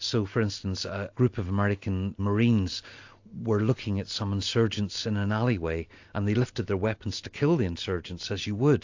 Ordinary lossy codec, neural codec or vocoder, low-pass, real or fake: AAC, 48 kbps; none; 7.2 kHz; real